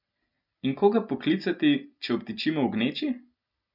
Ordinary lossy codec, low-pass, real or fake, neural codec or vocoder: none; 5.4 kHz; real; none